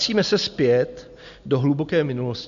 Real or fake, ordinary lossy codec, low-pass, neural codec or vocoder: real; MP3, 96 kbps; 7.2 kHz; none